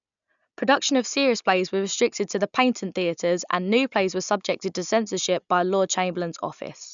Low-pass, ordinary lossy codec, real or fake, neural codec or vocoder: 7.2 kHz; none; real; none